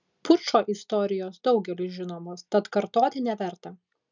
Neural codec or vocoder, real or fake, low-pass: none; real; 7.2 kHz